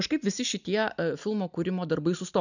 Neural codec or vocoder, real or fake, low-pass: none; real; 7.2 kHz